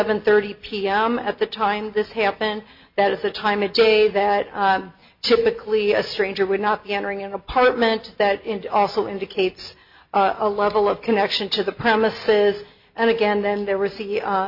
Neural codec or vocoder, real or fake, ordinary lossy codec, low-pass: none; real; MP3, 48 kbps; 5.4 kHz